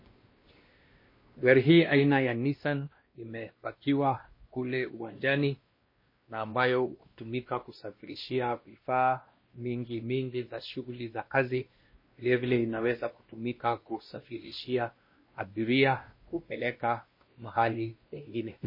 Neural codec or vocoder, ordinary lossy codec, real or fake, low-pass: codec, 16 kHz, 1 kbps, X-Codec, WavLM features, trained on Multilingual LibriSpeech; MP3, 24 kbps; fake; 5.4 kHz